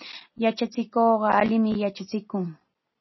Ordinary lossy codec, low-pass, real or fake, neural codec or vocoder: MP3, 24 kbps; 7.2 kHz; real; none